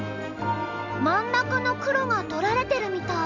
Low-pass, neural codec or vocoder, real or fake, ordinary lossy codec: 7.2 kHz; none; real; none